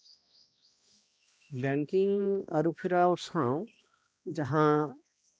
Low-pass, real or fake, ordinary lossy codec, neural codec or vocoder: none; fake; none; codec, 16 kHz, 1 kbps, X-Codec, HuBERT features, trained on balanced general audio